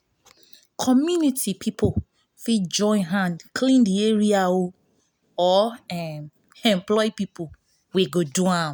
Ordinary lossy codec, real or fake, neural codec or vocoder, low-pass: none; real; none; none